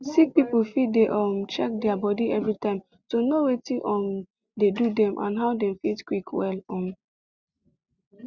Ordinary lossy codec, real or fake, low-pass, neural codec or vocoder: Opus, 64 kbps; real; 7.2 kHz; none